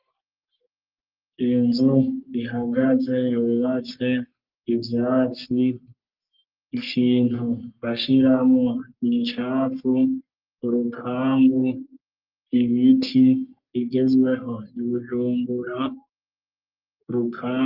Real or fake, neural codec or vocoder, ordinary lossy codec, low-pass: fake; codec, 44.1 kHz, 3.4 kbps, Pupu-Codec; Opus, 24 kbps; 5.4 kHz